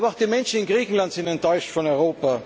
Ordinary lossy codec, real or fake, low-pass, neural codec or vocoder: none; real; none; none